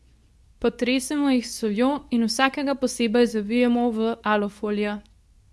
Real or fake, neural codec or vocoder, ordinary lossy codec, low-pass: fake; codec, 24 kHz, 0.9 kbps, WavTokenizer, medium speech release version 2; none; none